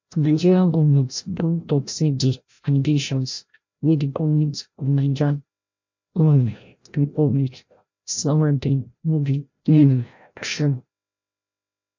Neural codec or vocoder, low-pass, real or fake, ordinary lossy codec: codec, 16 kHz, 0.5 kbps, FreqCodec, larger model; 7.2 kHz; fake; MP3, 48 kbps